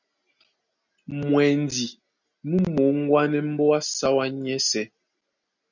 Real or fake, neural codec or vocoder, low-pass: real; none; 7.2 kHz